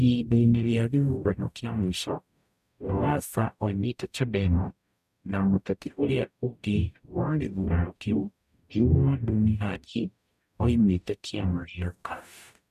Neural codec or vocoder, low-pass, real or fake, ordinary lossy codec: codec, 44.1 kHz, 0.9 kbps, DAC; 14.4 kHz; fake; none